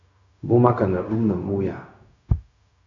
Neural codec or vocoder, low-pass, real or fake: codec, 16 kHz, 0.4 kbps, LongCat-Audio-Codec; 7.2 kHz; fake